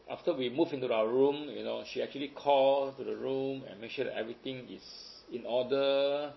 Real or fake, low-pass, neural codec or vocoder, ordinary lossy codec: real; 7.2 kHz; none; MP3, 24 kbps